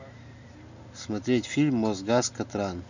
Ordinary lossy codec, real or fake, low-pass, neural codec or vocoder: none; real; 7.2 kHz; none